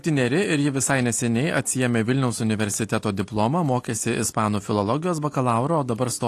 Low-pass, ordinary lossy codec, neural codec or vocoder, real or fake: 14.4 kHz; AAC, 48 kbps; none; real